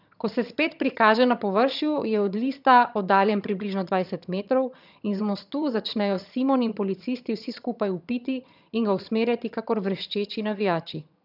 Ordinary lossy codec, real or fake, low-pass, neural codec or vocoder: none; fake; 5.4 kHz; vocoder, 22.05 kHz, 80 mel bands, HiFi-GAN